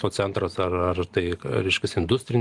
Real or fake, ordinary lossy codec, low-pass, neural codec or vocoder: real; Opus, 24 kbps; 10.8 kHz; none